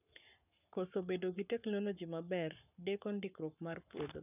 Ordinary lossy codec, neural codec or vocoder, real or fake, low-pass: none; codec, 44.1 kHz, 7.8 kbps, Pupu-Codec; fake; 3.6 kHz